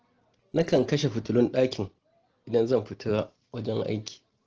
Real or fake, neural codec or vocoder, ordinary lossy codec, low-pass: real; none; Opus, 16 kbps; 7.2 kHz